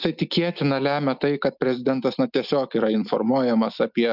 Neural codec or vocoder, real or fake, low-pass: none; real; 5.4 kHz